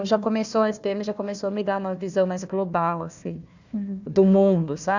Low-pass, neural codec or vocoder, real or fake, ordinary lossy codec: 7.2 kHz; codec, 16 kHz, 1 kbps, FunCodec, trained on Chinese and English, 50 frames a second; fake; none